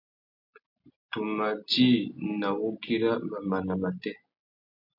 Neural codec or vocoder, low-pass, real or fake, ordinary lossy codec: none; 5.4 kHz; real; MP3, 48 kbps